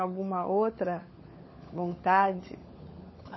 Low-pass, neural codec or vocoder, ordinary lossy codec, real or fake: 7.2 kHz; codec, 16 kHz, 16 kbps, FunCodec, trained on LibriTTS, 50 frames a second; MP3, 24 kbps; fake